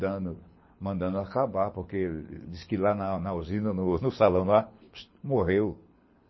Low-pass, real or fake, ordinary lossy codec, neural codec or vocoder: 7.2 kHz; fake; MP3, 24 kbps; codec, 24 kHz, 6 kbps, HILCodec